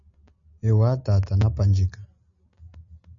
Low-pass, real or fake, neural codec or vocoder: 7.2 kHz; real; none